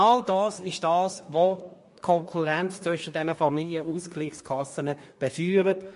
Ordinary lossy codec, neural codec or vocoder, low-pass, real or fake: MP3, 48 kbps; codec, 24 kHz, 1 kbps, SNAC; 10.8 kHz; fake